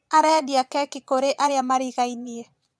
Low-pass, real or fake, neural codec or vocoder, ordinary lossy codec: none; fake; vocoder, 22.05 kHz, 80 mel bands, Vocos; none